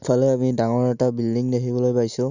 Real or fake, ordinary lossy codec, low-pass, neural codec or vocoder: real; none; 7.2 kHz; none